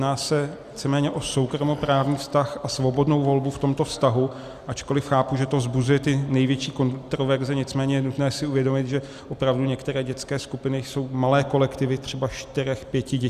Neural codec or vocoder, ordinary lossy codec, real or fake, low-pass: none; Opus, 64 kbps; real; 14.4 kHz